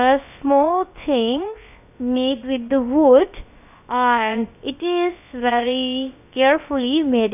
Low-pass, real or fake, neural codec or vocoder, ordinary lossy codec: 3.6 kHz; fake; codec, 16 kHz, about 1 kbps, DyCAST, with the encoder's durations; none